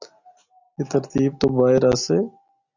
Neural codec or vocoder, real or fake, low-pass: none; real; 7.2 kHz